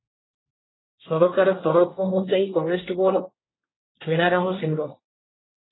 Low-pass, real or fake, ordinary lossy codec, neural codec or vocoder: 7.2 kHz; fake; AAC, 16 kbps; codec, 24 kHz, 1 kbps, SNAC